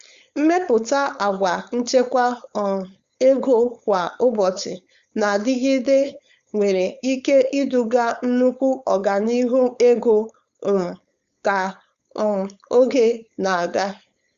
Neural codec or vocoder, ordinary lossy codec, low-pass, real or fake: codec, 16 kHz, 4.8 kbps, FACodec; Opus, 64 kbps; 7.2 kHz; fake